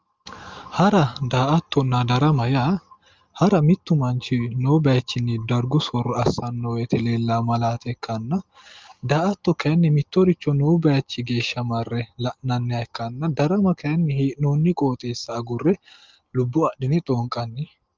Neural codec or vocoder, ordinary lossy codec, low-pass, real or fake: none; Opus, 32 kbps; 7.2 kHz; real